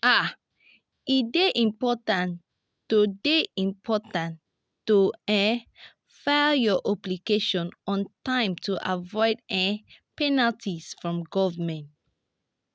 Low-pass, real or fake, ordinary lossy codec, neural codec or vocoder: none; real; none; none